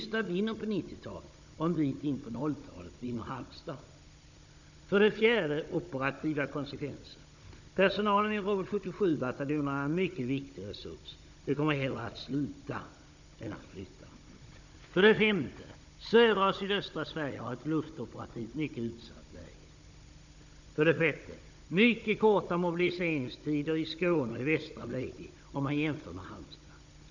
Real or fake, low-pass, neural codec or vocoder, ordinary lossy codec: fake; 7.2 kHz; codec, 16 kHz, 16 kbps, FunCodec, trained on Chinese and English, 50 frames a second; none